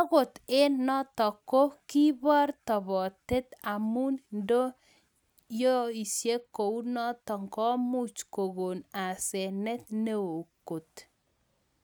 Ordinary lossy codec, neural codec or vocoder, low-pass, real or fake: none; none; none; real